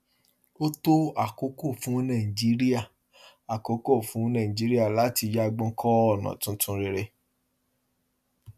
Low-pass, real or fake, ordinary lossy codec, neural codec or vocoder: 14.4 kHz; real; none; none